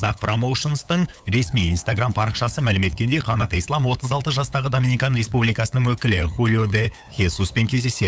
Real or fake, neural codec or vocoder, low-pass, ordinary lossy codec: fake; codec, 16 kHz, 8 kbps, FunCodec, trained on LibriTTS, 25 frames a second; none; none